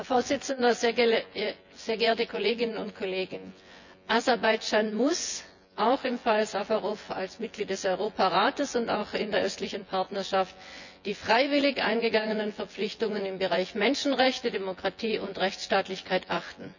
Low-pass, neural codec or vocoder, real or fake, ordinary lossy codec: 7.2 kHz; vocoder, 24 kHz, 100 mel bands, Vocos; fake; none